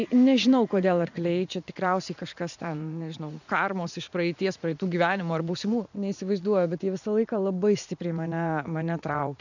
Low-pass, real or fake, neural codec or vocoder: 7.2 kHz; fake; vocoder, 44.1 kHz, 80 mel bands, Vocos